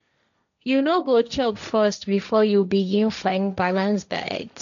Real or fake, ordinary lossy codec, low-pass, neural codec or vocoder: fake; none; 7.2 kHz; codec, 16 kHz, 1.1 kbps, Voila-Tokenizer